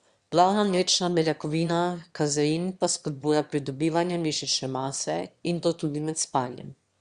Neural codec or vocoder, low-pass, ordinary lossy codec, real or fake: autoencoder, 22.05 kHz, a latent of 192 numbers a frame, VITS, trained on one speaker; 9.9 kHz; Opus, 64 kbps; fake